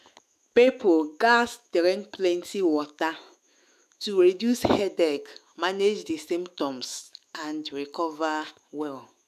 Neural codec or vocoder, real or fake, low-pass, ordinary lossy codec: autoencoder, 48 kHz, 128 numbers a frame, DAC-VAE, trained on Japanese speech; fake; 14.4 kHz; none